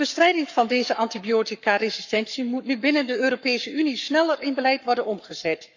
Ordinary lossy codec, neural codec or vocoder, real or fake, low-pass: none; codec, 44.1 kHz, 7.8 kbps, Pupu-Codec; fake; 7.2 kHz